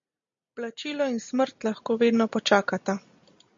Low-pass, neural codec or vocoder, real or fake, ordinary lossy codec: 7.2 kHz; none; real; MP3, 48 kbps